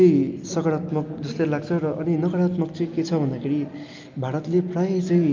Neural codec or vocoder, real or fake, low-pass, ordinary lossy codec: none; real; 7.2 kHz; Opus, 24 kbps